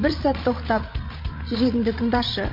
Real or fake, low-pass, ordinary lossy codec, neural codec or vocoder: fake; 5.4 kHz; MP3, 32 kbps; codec, 16 kHz, 16 kbps, FreqCodec, larger model